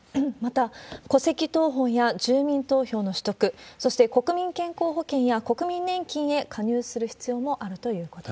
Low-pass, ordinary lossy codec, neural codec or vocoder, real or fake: none; none; none; real